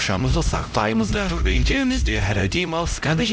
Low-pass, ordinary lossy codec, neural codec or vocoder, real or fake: none; none; codec, 16 kHz, 0.5 kbps, X-Codec, HuBERT features, trained on LibriSpeech; fake